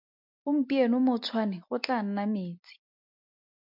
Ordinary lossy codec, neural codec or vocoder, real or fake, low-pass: MP3, 48 kbps; none; real; 5.4 kHz